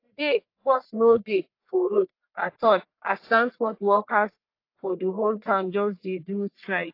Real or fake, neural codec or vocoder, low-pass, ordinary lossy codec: fake; codec, 44.1 kHz, 1.7 kbps, Pupu-Codec; 5.4 kHz; AAC, 32 kbps